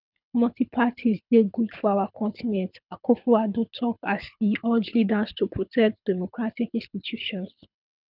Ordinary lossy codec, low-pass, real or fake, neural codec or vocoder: none; 5.4 kHz; fake; codec, 24 kHz, 6 kbps, HILCodec